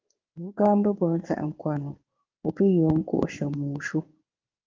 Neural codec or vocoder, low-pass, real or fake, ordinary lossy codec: codec, 16 kHz in and 24 kHz out, 1 kbps, XY-Tokenizer; 7.2 kHz; fake; Opus, 32 kbps